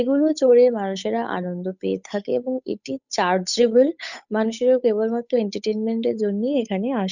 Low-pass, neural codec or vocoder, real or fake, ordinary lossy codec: 7.2 kHz; codec, 16 kHz, 8 kbps, FunCodec, trained on Chinese and English, 25 frames a second; fake; none